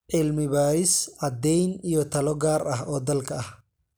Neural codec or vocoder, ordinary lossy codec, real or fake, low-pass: none; none; real; none